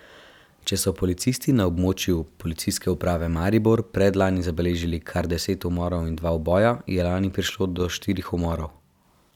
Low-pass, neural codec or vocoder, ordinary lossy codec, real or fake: 19.8 kHz; none; none; real